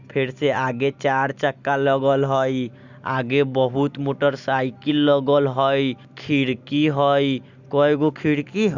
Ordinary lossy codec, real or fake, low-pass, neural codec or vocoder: none; real; 7.2 kHz; none